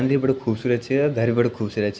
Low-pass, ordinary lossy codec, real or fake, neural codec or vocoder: none; none; real; none